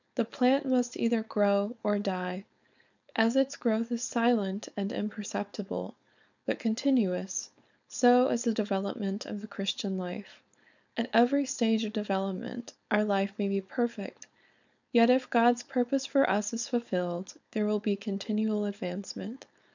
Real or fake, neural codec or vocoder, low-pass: fake; codec, 16 kHz, 4.8 kbps, FACodec; 7.2 kHz